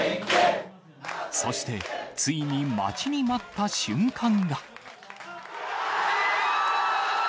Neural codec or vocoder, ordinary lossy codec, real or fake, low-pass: none; none; real; none